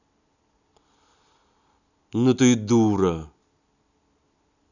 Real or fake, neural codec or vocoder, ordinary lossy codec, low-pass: real; none; none; 7.2 kHz